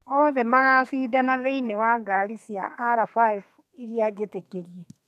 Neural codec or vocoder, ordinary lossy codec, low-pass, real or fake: codec, 32 kHz, 1.9 kbps, SNAC; none; 14.4 kHz; fake